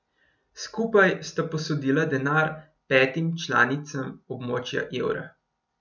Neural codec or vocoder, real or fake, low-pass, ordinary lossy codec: none; real; 7.2 kHz; none